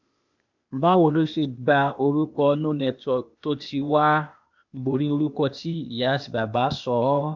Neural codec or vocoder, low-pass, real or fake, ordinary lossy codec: codec, 16 kHz, 0.8 kbps, ZipCodec; 7.2 kHz; fake; MP3, 64 kbps